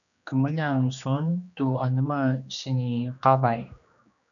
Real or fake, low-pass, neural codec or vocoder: fake; 7.2 kHz; codec, 16 kHz, 2 kbps, X-Codec, HuBERT features, trained on general audio